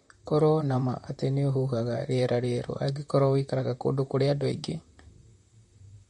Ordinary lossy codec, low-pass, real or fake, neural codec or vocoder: MP3, 48 kbps; 19.8 kHz; fake; vocoder, 44.1 kHz, 128 mel bands, Pupu-Vocoder